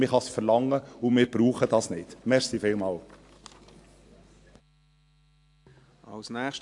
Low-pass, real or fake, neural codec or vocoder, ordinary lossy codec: 10.8 kHz; real; none; AAC, 64 kbps